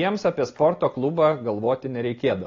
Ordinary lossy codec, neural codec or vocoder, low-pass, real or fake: AAC, 32 kbps; none; 7.2 kHz; real